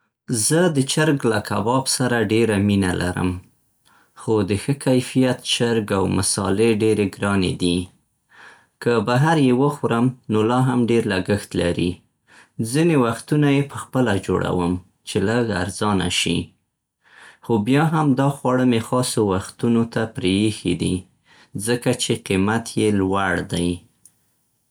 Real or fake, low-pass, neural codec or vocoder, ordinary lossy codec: real; none; none; none